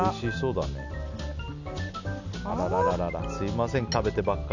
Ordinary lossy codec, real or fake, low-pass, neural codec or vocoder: none; real; 7.2 kHz; none